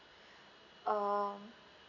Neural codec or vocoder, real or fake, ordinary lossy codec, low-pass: none; real; none; 7.2 kHz